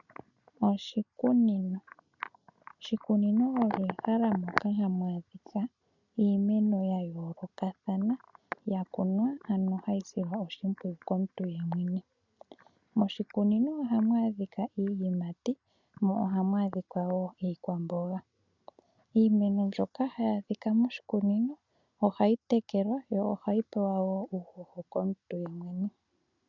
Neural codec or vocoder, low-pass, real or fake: none; 7.2 kHz; real